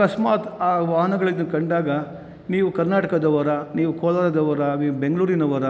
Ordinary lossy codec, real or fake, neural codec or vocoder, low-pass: none; real; none; none